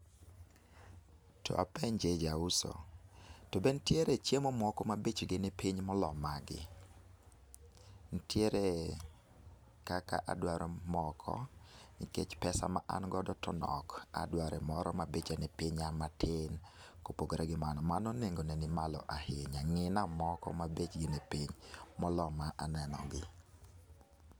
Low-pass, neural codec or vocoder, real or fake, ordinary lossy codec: none; none; real; none